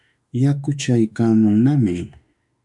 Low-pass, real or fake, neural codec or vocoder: 10.8 kHz; fake; autoencoder, 48 kHz, 32 numbers a frame, DAC-VAE, trained on Japanese speech